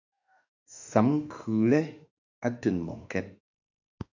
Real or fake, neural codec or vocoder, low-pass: fake; autoencoder, 48 kHz, 32 numbers a frame, DAC-VAE, trained on Japanese speech; 7.2 kHz